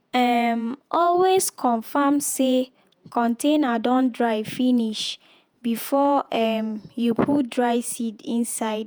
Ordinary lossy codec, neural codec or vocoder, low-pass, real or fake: none; vocoder, 48 kHz, 128 mel bands, Vocos; none; fake